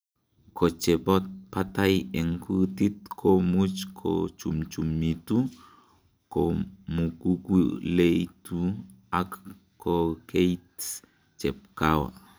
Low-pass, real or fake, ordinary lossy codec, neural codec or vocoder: none; real; none; none